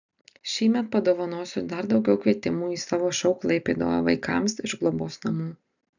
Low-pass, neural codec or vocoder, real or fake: 7.2 kHz; none; real